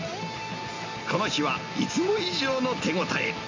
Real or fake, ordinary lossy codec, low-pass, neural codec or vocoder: real; MP3, 64 kbps; 7.2 kHz; none